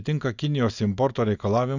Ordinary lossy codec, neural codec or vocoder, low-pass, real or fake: Opus, 64 kbps; none; 7.2 kHz; real